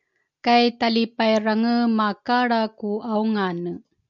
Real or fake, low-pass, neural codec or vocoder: real; 7.2 kHz; none